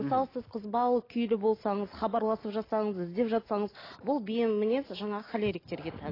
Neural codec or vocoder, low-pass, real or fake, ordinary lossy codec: none; 5.4 kHz; real; AAC, 24 kbps